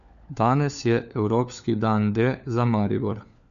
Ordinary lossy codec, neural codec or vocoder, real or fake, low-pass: AAC, 96 kbps; codec, 16 kHz, 4 kbps, FunCodec, trained on LibriTTS, 50 frames a second; fake; 7.2 kHz